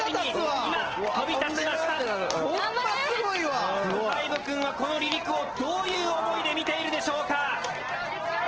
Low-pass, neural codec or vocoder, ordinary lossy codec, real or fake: 7.2 kHz; none; Opus, 16 kbps; real